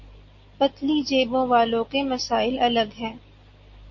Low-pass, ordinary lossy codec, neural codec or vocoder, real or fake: 7.2 kHz; MP3, 32 kbps; none; real